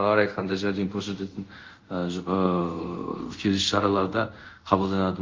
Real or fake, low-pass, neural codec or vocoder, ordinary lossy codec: fake; 7.2 kHz; codec, 24 kHz, 0.5 kbps, DualCodec; Opus, 24 kbps